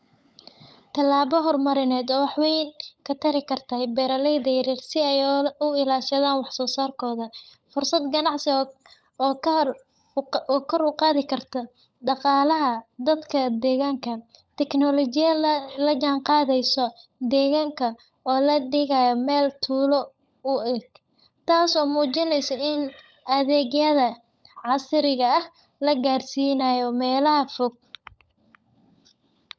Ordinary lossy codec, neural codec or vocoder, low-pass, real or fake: none; codec, 16 kHz, 16 kbps, FunCodec, trained on LibriTTS, 50 frames a second; none; fake